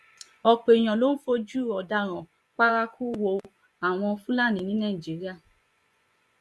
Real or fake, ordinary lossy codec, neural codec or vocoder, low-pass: fake; none; vocoder, 24 kHz, 100 mel bands, Vocos; none